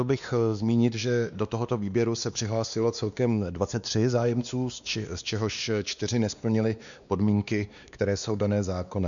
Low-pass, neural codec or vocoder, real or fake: 7.2 kHz; codec, 16 kHz, 2 kbps, X-Codec, WavLM features, trained on Multilingual LibriSpeech; fake